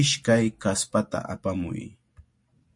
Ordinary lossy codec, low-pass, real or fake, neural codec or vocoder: MP3, 48 kbps; 10.8 kHz; real; none